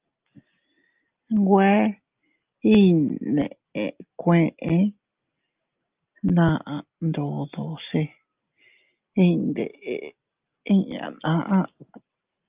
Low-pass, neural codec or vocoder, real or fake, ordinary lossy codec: 3.6 kHz; none; real; Opus, 24 kbps